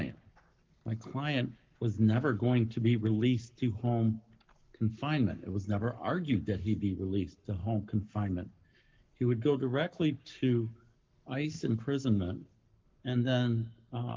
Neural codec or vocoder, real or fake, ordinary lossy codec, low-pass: codec, 44.1 kHz, 7.8 kbps, Pupu-Codec; fake; Opus, 32 kbps; 7.2 kHz